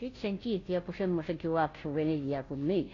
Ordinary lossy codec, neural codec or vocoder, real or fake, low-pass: AAC, 32 kbps; codec, 16 kHz, 0.5 kbps, FunCodec, trained on Chinese and English, 25 frames a second; fake; 7.2 kHz